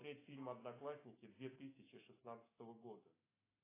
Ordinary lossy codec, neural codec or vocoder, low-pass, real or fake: AAC, 16 kbps; autoencoder, 48 kHz, 128 numbers a frame, DAC-VAE, trained on Japanese speech; 3.6 kHz; fake